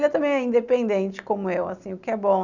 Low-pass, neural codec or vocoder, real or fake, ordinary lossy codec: 7.2 kHz; none; real; none